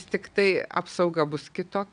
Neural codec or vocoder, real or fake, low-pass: vocoder, 22.05 kHz, 80 mel bands, WaveNeXt; fake; 9.9 kHz